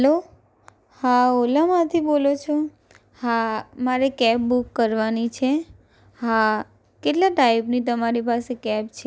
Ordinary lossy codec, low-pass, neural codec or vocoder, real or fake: none; none; none; real